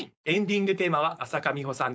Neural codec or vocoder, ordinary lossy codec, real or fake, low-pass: codec, 16 kHz, 4.8 kbps, FACodec; none; fake; none